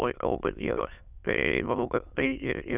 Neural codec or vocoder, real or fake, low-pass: autoencoder, 22.05 kHz, a latent of 192 numbers a frame, VITS, trained on many speakers; fake; 3.6 kHz